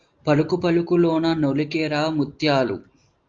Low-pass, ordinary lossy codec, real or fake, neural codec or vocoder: 7.2 kHz; Opus, 32 kbps; real; none